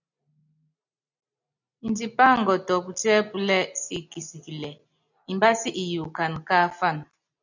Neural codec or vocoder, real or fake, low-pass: none; real; 7.2 kHz